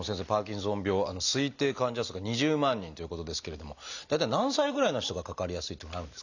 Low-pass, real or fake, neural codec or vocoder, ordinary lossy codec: 7.2 kHz; real; none; none